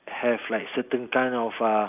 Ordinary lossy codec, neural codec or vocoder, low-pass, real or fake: none; none; 3.6 kHz; real